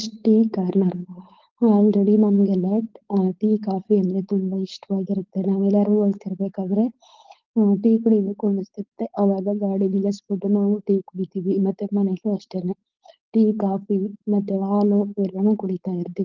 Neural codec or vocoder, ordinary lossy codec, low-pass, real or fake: codec, 16 kHz, 4.8 kbps, FACodec; Opus, 16 kbps; 7.2 kHz; fake